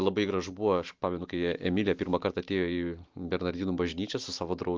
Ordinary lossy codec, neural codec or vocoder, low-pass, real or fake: Opus, 32 kbps; none; 7.2 kHz; real